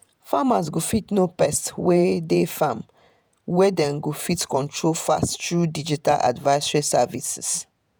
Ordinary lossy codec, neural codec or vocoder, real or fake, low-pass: none; vocoder, 48 kHz, 128 mel bands, Vocos; fake; none